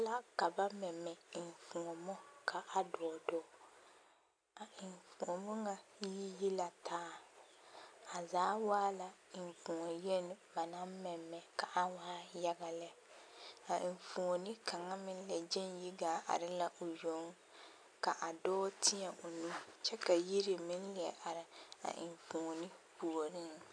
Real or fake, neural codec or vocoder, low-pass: real; none; 9.9 kHz